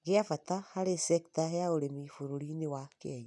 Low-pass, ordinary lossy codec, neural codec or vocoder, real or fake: 10.8 kHz; none; none; real